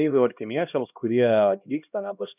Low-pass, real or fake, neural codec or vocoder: 3.6 kHz; fake; codec, 16 kHz, 1 kbps, X-Codec, HuBERT features, trained on LibriSpeech